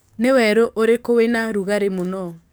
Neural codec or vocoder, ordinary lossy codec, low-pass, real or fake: codec, 44.1 kHz, 7.8 kbps, DAC; none; none; fake